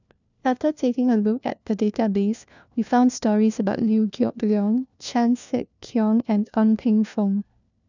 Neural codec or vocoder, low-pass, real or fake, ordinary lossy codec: codec, 16 kHz, 1 kbps, FunCodec, trained on LibriTTS, 50 frames a second; 7.2 kHz; fake; none